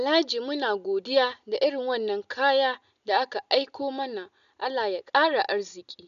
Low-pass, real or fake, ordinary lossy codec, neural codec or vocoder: 7.2 kHz; real; none; none